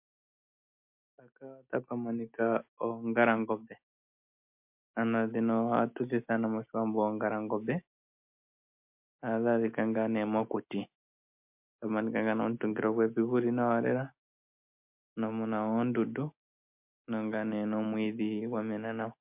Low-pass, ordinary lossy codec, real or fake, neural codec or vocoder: 3.6 kHz; MP3, 32 kbps; real; none